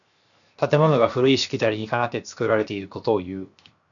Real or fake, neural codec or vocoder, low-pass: fake; codec, 16 kHz, 0.7 kbps, FocalCodec; 7.2 kHz